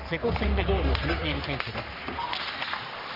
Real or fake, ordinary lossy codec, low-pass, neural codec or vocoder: fake; none; 5.4 kHz; codec, 44.1 kHz, 3.4 kbps, Pupu-Codec